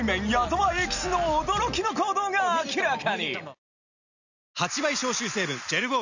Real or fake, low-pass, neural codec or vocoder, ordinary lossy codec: real; 7.2 kHz; none; none